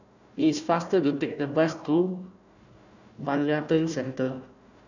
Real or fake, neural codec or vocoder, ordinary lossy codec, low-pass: fake; codec, 16 kHz, 1 kbps, FunCodec, trained on Chinese and English, 50 frames a second; none; 7.2 kHz